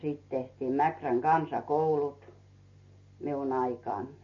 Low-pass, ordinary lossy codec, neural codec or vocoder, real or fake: 7.2 kHz; MP3, 32 kbps; none; real